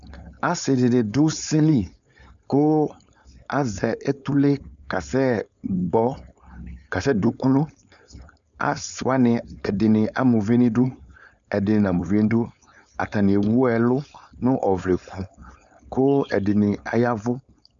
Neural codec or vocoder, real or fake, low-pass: codec, 16 kHz, 4.8 kbps, FACodec; fake; 7.2 kHz